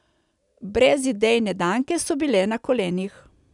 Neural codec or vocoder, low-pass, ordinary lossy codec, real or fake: none; 10.8 kHz; none; real